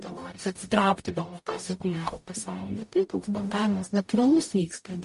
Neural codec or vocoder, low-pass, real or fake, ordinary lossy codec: codec, 44.1 kHz, 0.9 kbps, DAC; 14.4 kHz; fake; MP3, 48 kbps